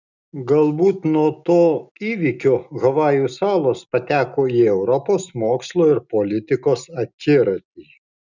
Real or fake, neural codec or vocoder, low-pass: real; none; 7.2 kHz